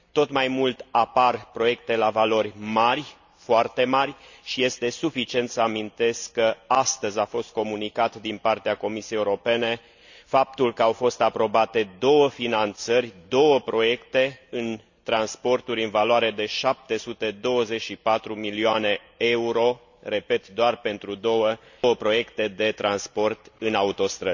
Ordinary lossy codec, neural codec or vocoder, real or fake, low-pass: none; none; real; 7.2 kHz